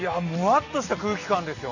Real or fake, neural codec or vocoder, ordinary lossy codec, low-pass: real; none; none; 7.2 kHz